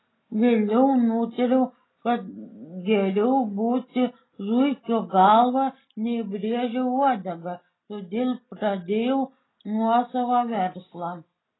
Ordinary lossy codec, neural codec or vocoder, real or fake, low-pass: AAC, 16 kbps; none; real; 7.2 kHz